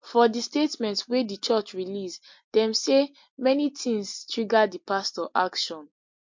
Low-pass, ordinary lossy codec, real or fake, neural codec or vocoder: 7.2 kHz; MP3, 48 kbps; real; none